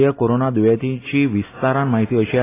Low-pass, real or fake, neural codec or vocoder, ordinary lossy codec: 3.6 kHz; real; none; AAC, 16 kbps